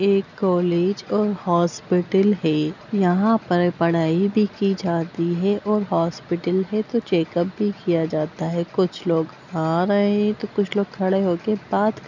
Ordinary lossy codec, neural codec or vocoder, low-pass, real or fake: none; none; 7.2 kHz; real